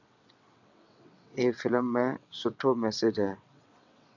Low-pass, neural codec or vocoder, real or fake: 7.2 kHz; vocoder, 22.05 kHz, 80 mel bands, WaveNeXt; fake